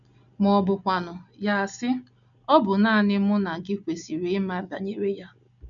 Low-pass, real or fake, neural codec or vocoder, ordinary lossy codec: 7.2 kHz; real; none; none